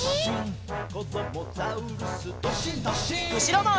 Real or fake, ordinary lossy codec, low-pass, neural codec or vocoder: real; none; none; none